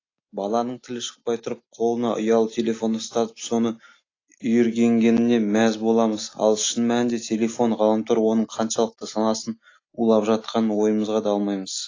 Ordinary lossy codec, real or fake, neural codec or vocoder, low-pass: AAC, 32 kbps; real; none; 7.2 kHz